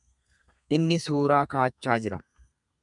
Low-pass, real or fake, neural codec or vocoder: 10.8 kHz; fake; codec, 32 kHz, 1.9 kbps, SNAC